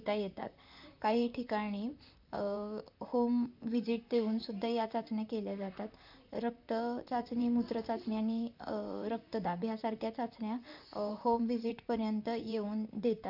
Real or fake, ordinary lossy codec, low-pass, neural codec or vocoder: fake; none; 5.4 kHz; vocoder, 44.1 kHz, 128 mel bands, Pupu-Vocoder